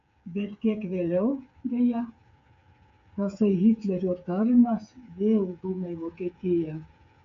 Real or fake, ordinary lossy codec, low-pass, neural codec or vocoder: fake; MP3, 64 kbps; 7.2 kHz; codec, 16 kHz, 8 kbps, FreqCodec, smaller model